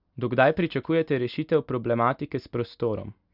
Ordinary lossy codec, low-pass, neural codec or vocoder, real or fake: none; 5.4 kHz; none; real